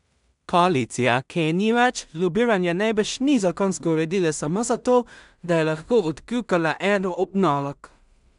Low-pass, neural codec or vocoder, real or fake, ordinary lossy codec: 10.8 kHz; codec, 16 kHz in and 24 kHz out, 0.4 kbps, LongCat-Audio-Codec, two codebook decoder; fake; none